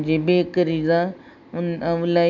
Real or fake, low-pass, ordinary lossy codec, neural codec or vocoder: real; 7.2 kHz; none; none